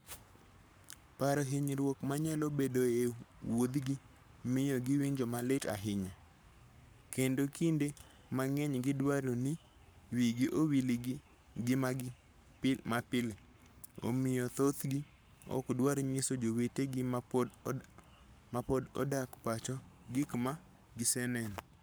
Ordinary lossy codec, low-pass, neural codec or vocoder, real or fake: none; none; codec, 44.1 kHz, 7.8 kbps, Pupu-Codec; fake